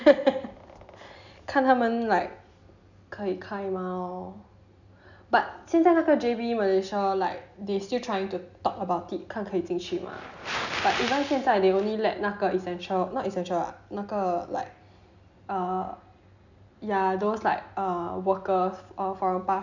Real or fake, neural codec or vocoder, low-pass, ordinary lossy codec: real; none; 7.2 kHz; none